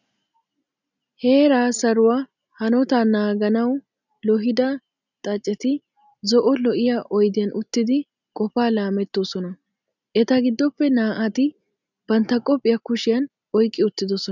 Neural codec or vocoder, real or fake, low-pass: none; real; 7.2 kHz